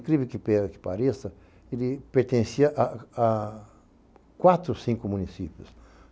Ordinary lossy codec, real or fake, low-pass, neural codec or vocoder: none; real; none; none